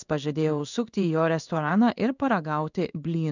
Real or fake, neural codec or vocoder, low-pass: fake; codec, 16 kHz in and 24 kHz out, 1 kbps, XY-Tokenizer; 7.2 kHz